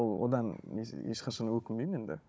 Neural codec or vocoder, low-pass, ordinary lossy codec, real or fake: codec, 16 kHz, 16 kbps, FunCodec, trained on Chinese and English, 50 frames a second; none; none; fake